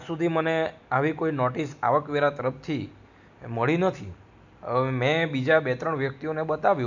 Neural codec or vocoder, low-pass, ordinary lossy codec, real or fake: none; 7.2 kHz; none; real